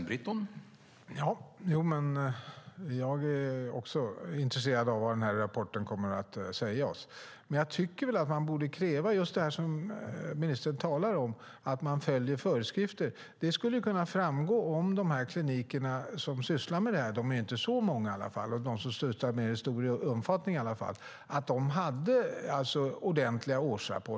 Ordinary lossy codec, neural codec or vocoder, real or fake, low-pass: none; none; real; none